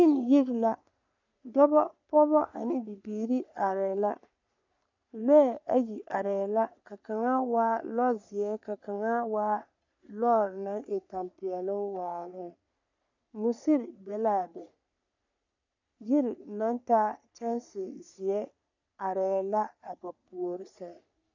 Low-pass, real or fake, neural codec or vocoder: 7.2 kHz; fake; codec, 44.1 kHz, 3.4 kbps, Pupu-Codec